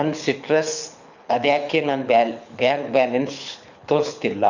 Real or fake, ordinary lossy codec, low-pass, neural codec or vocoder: fake; none; 7.2 kHz; codec, 24 kHz, 6 kbps, HILCodec